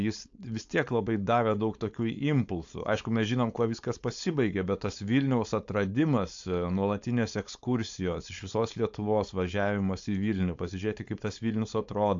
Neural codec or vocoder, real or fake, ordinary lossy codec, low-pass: codec, 16 kHz, 4.8 kbps, FACodec; fake; AAC, 64 kbps; 7.2 kHz